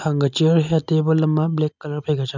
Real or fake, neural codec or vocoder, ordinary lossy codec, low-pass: real; none; none; 7.2 kHz